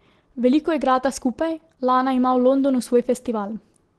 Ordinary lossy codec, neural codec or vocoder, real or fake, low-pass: Opus, 16 kbps; none; real; 10.8 kHz